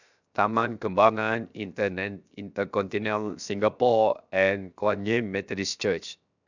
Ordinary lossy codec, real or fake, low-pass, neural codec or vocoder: none; fake; 7.2 kHz; codec, 16 kHz, 0.7 kbps, FocalCodec